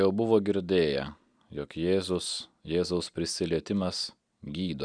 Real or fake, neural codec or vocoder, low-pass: real; none; 9.9 kHz